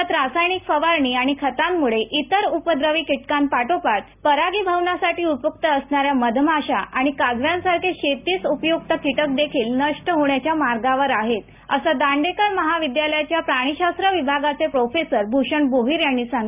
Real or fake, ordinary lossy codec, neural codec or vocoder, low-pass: real; none; none; 3.6 kHz